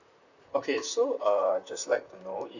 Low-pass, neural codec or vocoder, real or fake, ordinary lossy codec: 7.2 kHz; vocoder, 44.1 kHz, 128 mel bands, Pupu-Vocoder; fake; none